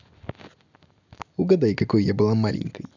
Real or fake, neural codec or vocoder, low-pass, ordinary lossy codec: real; none; 7.2 kHz; none